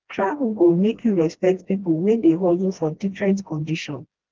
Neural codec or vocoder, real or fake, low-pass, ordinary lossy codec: codec, 16 kHz, 1 kbps, FreqCodec, smaller model; fake; 7.2 kHz; Opus, 16 kbps